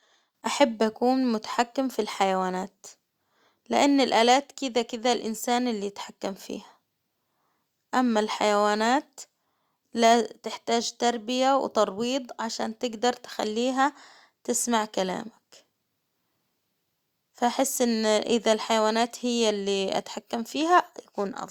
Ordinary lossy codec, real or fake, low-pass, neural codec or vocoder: Opus, 64 kbps; real; 19.8 kHz; none